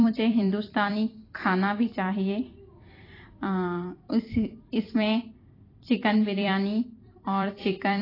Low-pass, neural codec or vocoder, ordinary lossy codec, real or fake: 5.4 kHz; vocoder, 44.1 kHz, 128 mel bands every 256 samples, BigVGAN v2; AAC, 24 kbps; fake